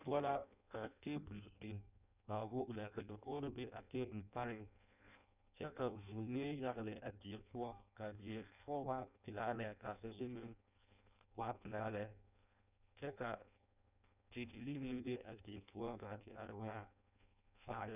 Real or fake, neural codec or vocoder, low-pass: fake; codec, 16 kHz in and 24 kHz out, 0.6 kbps, FireRedTTS-2 codec; 3.6 kHz